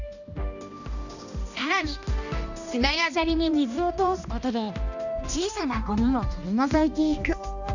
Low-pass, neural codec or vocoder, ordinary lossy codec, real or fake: 7.2 kHz; codec, 16 kHz, 1 kbps, X-Codec, HuBERT features, trained on balanced general audio; none; fake